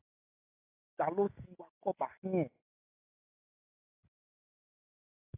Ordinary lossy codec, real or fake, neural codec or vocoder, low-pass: AAC, 32 kbps; real; none; 3.6 kHz